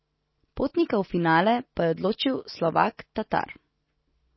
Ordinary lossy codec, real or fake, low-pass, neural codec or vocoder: MP3, 24 kbps; real; 7.2 kHz; none